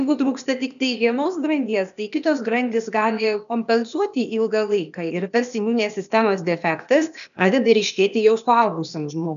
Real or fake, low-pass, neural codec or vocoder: fake; 7.2 kHz; codec, 16 kHz, 0.8 kbps, ZipCodec